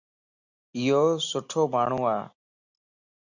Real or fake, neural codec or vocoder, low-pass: real; none; 7.2 kHz